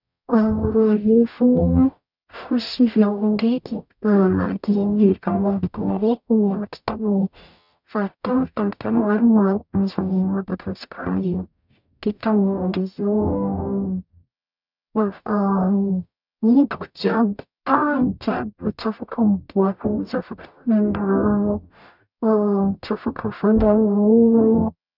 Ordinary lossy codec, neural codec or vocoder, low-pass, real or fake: none; codec, 44.1 kHz, 0.9 kbps, DAC; 5.4 kHz; fake